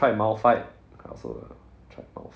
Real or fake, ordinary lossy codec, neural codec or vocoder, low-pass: real; none; none; none